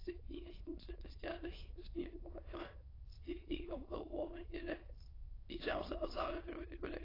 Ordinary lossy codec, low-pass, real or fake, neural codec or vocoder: AAC, 24 kbps; 5.4 kHz; fake; autoencoder, 22.05 kHz, a latent of 192 numbers a frame, VITS, trained on many speakers